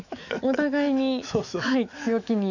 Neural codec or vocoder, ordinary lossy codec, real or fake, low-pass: autoencoder, 48 kHz, 128 numbers a frame, DAC-VAE, trained on Japanese speech; none; fake; 7.2 kHz